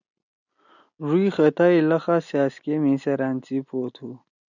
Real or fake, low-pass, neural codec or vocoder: real; 7.2 kHz; none